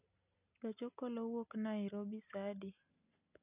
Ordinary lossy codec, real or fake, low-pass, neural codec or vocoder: none; real; 3.6 kHz; none